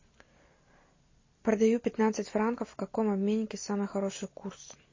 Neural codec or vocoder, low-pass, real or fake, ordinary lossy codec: none; 7.2 kHz; real; MP3, 32 kbps